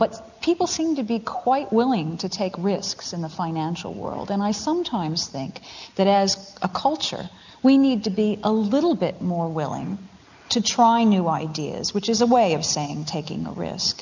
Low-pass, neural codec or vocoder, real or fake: 7.2 kHz; none; real